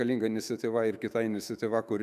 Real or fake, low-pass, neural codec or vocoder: fake; 14.4 kHz; autoencoder, 48 kHz, 128 numbers a frame, DAC-VAE, trained on Japanese speech